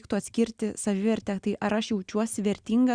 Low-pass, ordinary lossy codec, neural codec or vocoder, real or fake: 9.9 kHz; Opus, 64 kbps; none; real